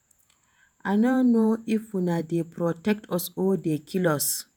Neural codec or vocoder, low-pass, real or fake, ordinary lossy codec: vocoder, 48 kHz, 128 mel bands, Vocos; none; fake; none